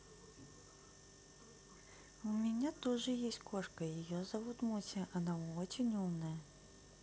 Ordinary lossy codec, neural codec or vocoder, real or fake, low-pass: none; none; real; none